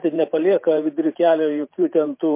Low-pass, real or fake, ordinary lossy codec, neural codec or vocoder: 3.6 kHz; fake; MP3, 24 kbps; vocoder, 44.1 kHz, 128 mel bands every 512 samples, BigVGAN v2